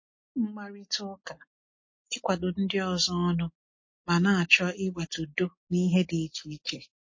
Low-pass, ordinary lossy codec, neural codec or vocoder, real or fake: 7.2 kHz; MP3, 32 kbps; none; real